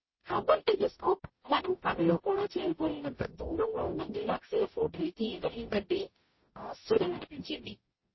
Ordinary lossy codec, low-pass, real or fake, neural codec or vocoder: MP3, 24 kbps; 7.2 kHz; fake; codec, 44.1 kHz, 0.9 kbps, DAC